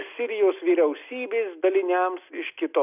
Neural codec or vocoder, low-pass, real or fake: none; 3.6 kHz; real